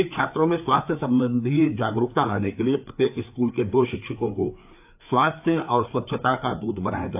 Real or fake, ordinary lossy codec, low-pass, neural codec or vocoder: fake; AAC, 32 kbps; 3.6 kHz; codec, 16 kHz, 4 kbps, FreqCodec, larger model